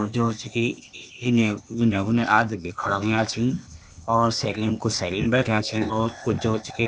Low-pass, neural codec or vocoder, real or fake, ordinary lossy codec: none; codec, 16 kHz, 0.8 kbps, ZipCodec; fake; none